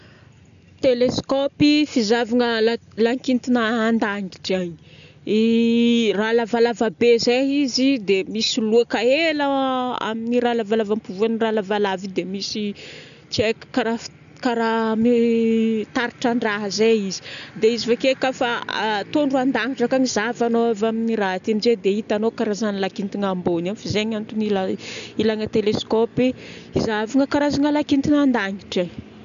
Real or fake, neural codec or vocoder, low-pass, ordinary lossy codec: real; none; 7.2 kHz; none